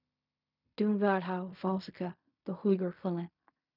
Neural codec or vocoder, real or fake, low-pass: codec, 16 kHz in and 24 kHz out, 0.4 kbps, LongCat-Audio-Codec, fine tuned four codebook decoder; fake; 5.4 kHz